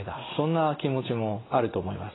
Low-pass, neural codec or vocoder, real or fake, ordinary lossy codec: 7.2 kHz; none; real; AAC, 16 kbps